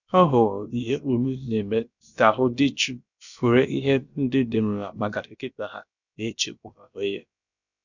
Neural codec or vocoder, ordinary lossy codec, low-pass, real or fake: codec, 16 kHz, about 1 kbps, DyCAST, with the encoder's durations; none; 7.2 kHz; fake